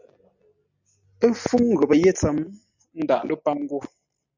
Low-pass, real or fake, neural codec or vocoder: 7.2 kHz; real; none